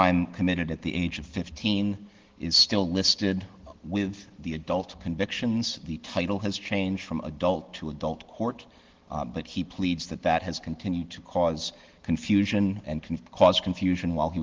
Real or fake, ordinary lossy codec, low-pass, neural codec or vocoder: real; Opus, 16 kbps; 7.2 kHz; none